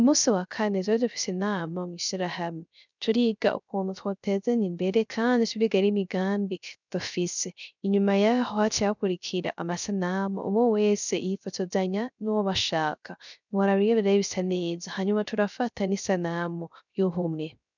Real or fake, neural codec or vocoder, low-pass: fake; codec, 16 kHz, 0.3 kbps, FocalCodec; 7.2 kHz